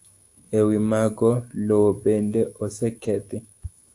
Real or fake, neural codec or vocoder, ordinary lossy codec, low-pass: fake; autoencoder, 48 kHz, 128 numbers a frame, DAC-VAE, trained on Japanese speech; AAC, 64 kbps; 10.8 kHz